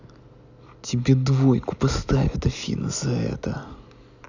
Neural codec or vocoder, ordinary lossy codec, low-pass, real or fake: none; none; 7.2 kHz; real